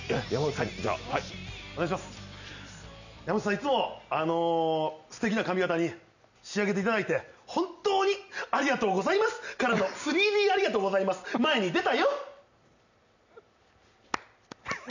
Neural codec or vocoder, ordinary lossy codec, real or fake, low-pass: none; none; real; 7.2 kHz